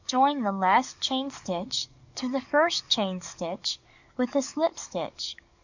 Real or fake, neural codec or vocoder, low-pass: fake; codec, 44.1 kHz, 7.8 kbps, DAC; 7.2 kHz